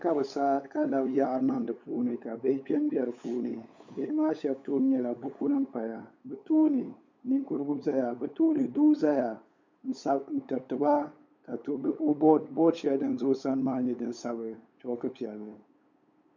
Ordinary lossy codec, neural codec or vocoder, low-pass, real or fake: MP3, 64 kbps; codec, 16 kHz, 8 kbps, FunCodec, trained on LibriTTS, 25 frames a second; 7.2 kHz; fake